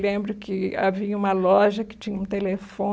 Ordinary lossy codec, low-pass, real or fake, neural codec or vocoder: none; none; real; none